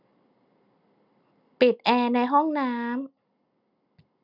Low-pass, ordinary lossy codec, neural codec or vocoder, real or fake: 5.4 kHz; none; none; real